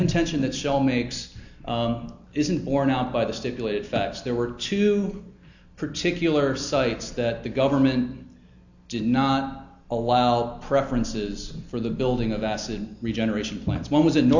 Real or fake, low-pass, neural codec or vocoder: real; 7.2 kHz; none